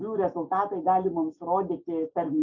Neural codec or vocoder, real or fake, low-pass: none; real; 7.2 kHz